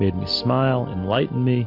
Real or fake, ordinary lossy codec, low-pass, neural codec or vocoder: real; MP3, 48 kbps; 5.4 kHz; none